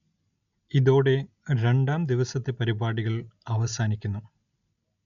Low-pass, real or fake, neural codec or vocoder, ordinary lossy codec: 7.2 kHz; real; none; none